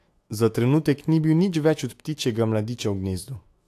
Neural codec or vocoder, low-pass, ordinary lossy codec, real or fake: autoencoder, 48 kHz, 128 numbers a frame, DAC-VAE, trained on Japanese speech; 14.4 kHz; AAC, 64 kbps; fake